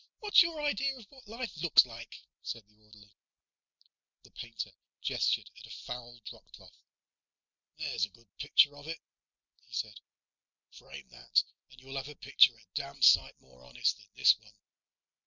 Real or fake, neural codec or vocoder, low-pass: real; none; 7.2 kHz